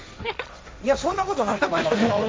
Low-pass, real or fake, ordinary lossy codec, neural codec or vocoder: none; fake; none; codec, 16 kHz, 1.1 kbps, Voila-Tokenizer